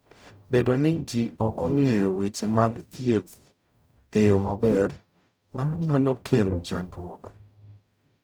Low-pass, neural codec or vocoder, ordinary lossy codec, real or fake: none; codec, 44.1 kHz, 0.9 kbps, DAC; none; fake